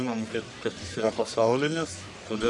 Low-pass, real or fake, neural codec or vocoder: 10.8 kHz; fake; codec, 44.1 kHz, 1.7 kbps, Pupu-Codec